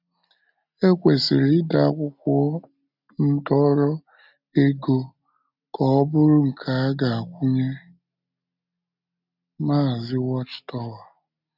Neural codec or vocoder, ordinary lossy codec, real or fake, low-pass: none; none; real; 5.4 kHz